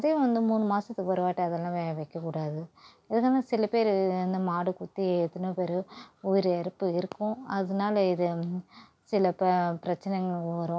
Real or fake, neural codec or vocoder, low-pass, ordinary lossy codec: real; none; none; none